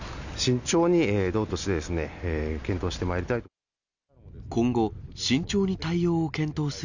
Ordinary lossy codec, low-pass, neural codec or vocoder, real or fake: none; 7.2 kHz; none; real